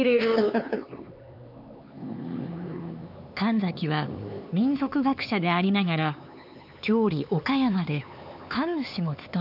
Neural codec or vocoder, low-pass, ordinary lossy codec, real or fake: codec, 16 kHz, 4 kbps, X-Codec, HuBERT features, trained on LibriSpeech; 5.4 kHz; none; fake